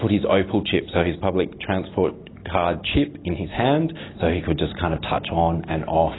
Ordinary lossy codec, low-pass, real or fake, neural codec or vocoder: AAC, 16 kbps; 7.2 kHz; real; none